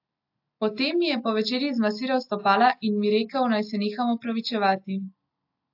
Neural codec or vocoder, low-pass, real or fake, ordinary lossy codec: none; 5.4 kHz; real; AAC, 48 kbps